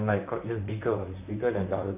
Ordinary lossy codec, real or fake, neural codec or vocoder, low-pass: none; fake; vocoder, 44.1 kHz, 128 mel bands, Pupu-Vocoder; 3.6 kHz